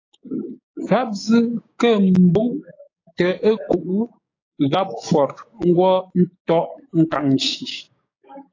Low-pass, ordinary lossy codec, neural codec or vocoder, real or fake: 7.2 kHz; AAC, 32 kbps; codec, 16 kHz, 6 kbps, DAC; fake